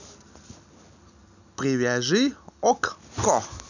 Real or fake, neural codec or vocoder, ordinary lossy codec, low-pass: real; none; none; 7.2 kHz